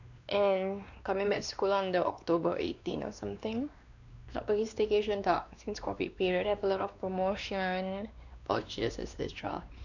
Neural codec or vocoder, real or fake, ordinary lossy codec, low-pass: codec, 16 kHz, 2 kbps, X-Codec, WavLM features, trained on Multilingual LibriSpeech; fake; none; 7.2 kHz